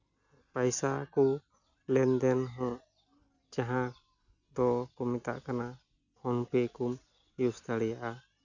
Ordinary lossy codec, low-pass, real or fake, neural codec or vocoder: none; 7.2 kHz; real; none